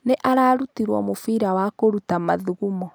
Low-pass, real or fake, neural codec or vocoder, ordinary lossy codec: none; real; none; none